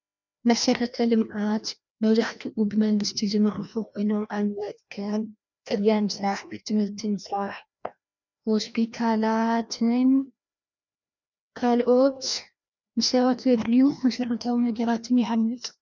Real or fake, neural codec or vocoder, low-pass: fake; codec, 16 kHz, 1 kbps, FreqCodec, larger model; 7.2 kHz